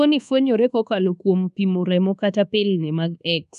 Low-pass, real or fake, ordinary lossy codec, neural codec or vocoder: 10.8 kHz; fake; none; codec, 24 kHz, 1.2 kbps, DualCodec